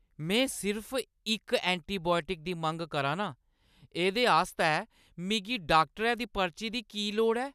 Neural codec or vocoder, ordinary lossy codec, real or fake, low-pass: none; none; real; 14.4 kHz